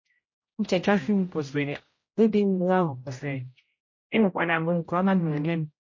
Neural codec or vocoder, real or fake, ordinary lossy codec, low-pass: codec, 16 kHz, 0.5 kbps, X-Codec, HuBERT features, trained on general audio; fake; MP3, 32 kbps; 7.2 kHz